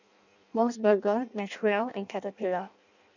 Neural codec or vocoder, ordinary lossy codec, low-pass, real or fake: codec, 16 kHz in and 24 kHz out, 0.6 kbps, FireRedTTS-2 codec; none; 7.2 kHz; fake